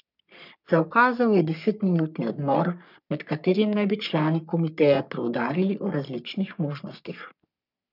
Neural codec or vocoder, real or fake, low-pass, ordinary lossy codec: codec, 44.1 kHz, 3.4 kbps, Pupu-Codec; fake; 5.4 kHz; none